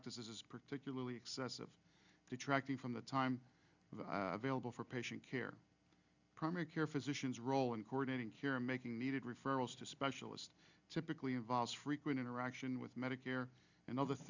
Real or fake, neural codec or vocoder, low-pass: real; none; 7.2 kHz